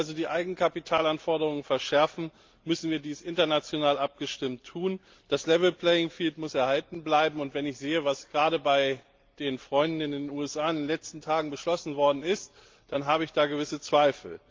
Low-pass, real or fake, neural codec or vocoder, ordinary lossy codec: 7.2 kHz; real; none; Opus, 32 kbps